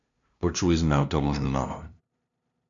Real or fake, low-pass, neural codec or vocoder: fake; 7.2 kHz; codec, 16 kHz, 0.5 kbps, FunCodec, trained on LibriTTS, 25 frames a second